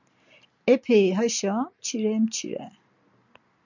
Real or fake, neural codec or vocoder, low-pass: real; none; 7.2 kHz